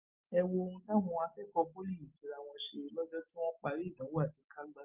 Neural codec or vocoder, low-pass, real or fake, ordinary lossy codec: none; 3.6 kHz; real; Opus, 24 kbps